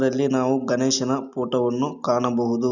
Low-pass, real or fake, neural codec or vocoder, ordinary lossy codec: 7.2 kHz; real; none; none